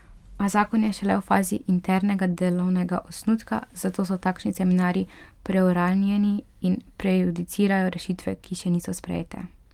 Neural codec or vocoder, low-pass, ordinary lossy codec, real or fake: vocoder, 44.1 kHz, 128 mel bands every 512 samples, BigVGAN v2; 19.8 kHz; Opus, 32 kbps; fake